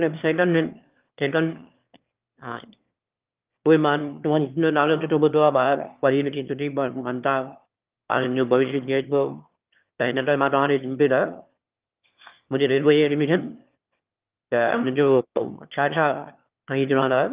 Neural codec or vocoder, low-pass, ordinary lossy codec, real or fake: autoencoder, 22.05 kHz, a latent of 192 numbers a frame, VITS, trained on one speaker; 3.6 kHz; Opus, 32 kbps; fake